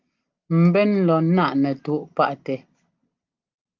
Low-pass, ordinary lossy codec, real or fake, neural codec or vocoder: 7.2 kHz; Opus, 24 kbps; real; none